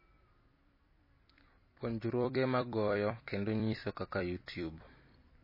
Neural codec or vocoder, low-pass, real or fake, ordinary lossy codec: vocoder, 44.1 kHz, 128 mel bands every 256 samples, BigVGAN v2; 5.4 kHz; fake; MP3, 24 kbps